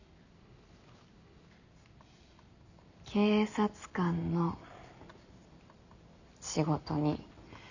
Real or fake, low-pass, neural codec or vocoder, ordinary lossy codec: real; 7.2 kHz; none; none